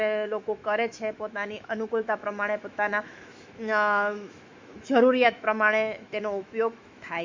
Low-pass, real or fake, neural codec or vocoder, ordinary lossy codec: 7.2 kHz; fake; autoencoder, 48 kHz, 128 numbers a frame, DAC-VAE, trained on Japanese speech; MP3, 48 kbps